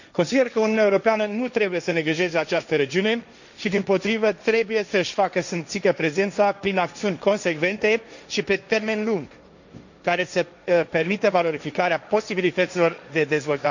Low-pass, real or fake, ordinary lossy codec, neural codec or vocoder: 7.2 kHz; fake; none; codec, 16 kHz, 1.1 kbps, Voila-Tokenizer